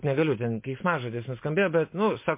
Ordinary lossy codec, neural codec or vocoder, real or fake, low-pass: MP3, 24 kbps; none; real; 3.6 kHz